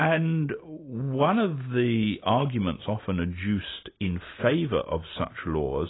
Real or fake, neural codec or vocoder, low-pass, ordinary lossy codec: real; none; 7.2 kHz; AAC, 16 kbps